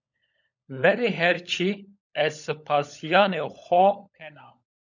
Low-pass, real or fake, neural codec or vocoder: 7.2 kHz; fake; codec, 16 kHz, 16 kbps, FunCodec, trained on LibriTTS, 50 frames a second